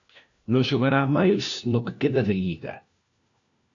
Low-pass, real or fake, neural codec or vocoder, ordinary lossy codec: 7.2 kHz; fake; codec, 16 kHz, 1 kbps, FunCodec, trained on LibriTTS, 50 frames a second; AAC, 64 kbps